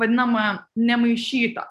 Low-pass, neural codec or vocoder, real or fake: 14.4 kHz; none; real